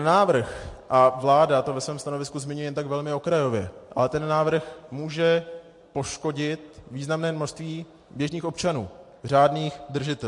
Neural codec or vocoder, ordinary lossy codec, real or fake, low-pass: none; MP3, 48 kbps; real; 10.8 kHz